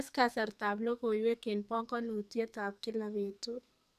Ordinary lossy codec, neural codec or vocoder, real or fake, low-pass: none; codec, 44.1 kHz, 2.6 kbps, SNAC; fake; 14.4 kHz